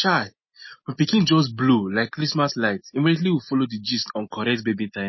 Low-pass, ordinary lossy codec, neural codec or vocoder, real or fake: 7.2 kHz; MP3, 24 kbps; none; real